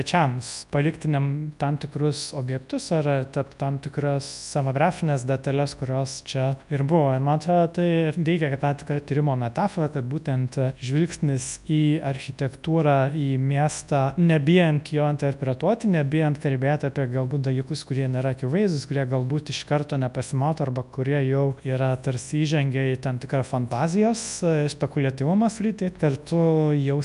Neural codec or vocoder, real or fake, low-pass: codec, 24 kHz, 0.9 kbps, WavTokenizer, large speech release; fake; 10.8 kHz